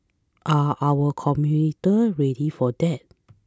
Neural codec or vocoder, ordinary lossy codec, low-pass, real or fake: none; none; none; real